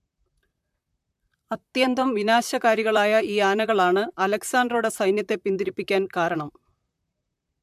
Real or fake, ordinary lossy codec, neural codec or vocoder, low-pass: fake; MP3, 96 kbps; vocoder, 44.1 kHz, 128 mel bands, Pupu-Vocoder; 14.4 kHz